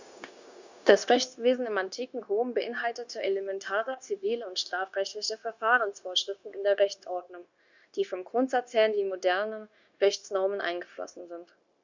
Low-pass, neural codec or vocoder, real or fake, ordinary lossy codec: 7.2 kHz; codec, 16 kHz, 0.9 kbps, LongCat-Audio-Codec; fake; Opus, 64 kbps